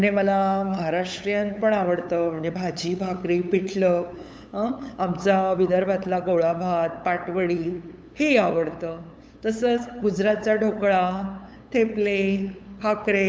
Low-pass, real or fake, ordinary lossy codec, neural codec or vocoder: none; fake; none; codec, 16 kHz, 8 kbps, FunCodec, trained on LibriTTS, 25 frames a second